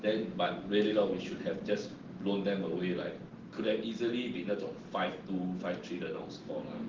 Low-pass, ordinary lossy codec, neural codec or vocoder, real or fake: 7.2 kHz; Opus, 16 kbps; none; real